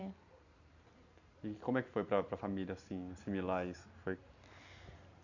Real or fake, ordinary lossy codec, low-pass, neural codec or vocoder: real; none; 7.2 kHz; none